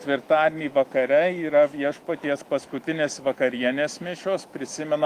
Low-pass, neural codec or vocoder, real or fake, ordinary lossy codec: 14.4 kHz; autoencoder, 48 kHz, 128 numbers a frame, DAC-VAE, trained on Japanese speech; fake; Opus, 32 kbps